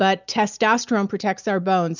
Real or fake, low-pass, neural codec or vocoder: real; 7.2 kHz; none